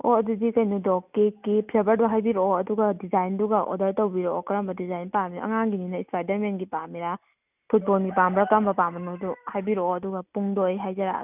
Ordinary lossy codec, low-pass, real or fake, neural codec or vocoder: none; 3.6 kHz; real; none